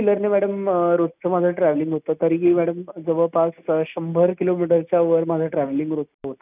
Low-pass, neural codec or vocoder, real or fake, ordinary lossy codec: 3.6 kHz; none; real; none